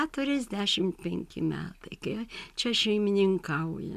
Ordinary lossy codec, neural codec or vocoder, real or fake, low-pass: MP3, 96 kbps; none; real; 14.4 kHz